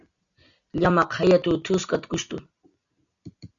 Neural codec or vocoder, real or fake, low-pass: none; real; 7.2 kHz